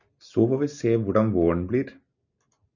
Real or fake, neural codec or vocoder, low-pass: real; none; 7.2 kHz